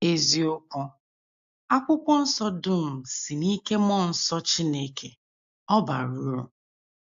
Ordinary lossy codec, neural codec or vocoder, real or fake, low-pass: AAC, 64 kbps; none; real; 7.2 kHz